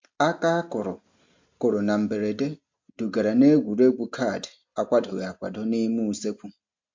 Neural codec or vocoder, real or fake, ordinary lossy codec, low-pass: none; real; MP3, 48 kbps; 7.2 kHz